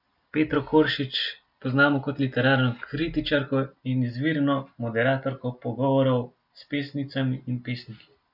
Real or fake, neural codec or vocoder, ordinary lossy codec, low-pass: real; none; none; 5.4 kHz